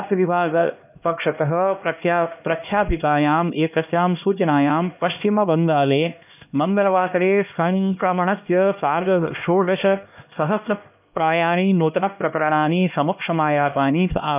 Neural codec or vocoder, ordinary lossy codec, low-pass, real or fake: codec, 16 kHz, 1 kbps, X-Codec, HuBERT features, trained on LibriSpeech; none; 3.6 kHz; fake